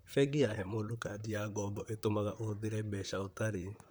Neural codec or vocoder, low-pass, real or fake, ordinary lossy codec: vocoder, 44.1 kHz, 128 mel bands, Pupu-Vocoder; none; fake; none